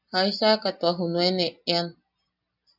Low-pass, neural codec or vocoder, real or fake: 5.4 kHz; none; real